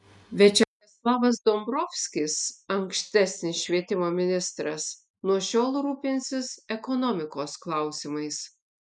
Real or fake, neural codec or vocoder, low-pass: real; none; 10.8 kHz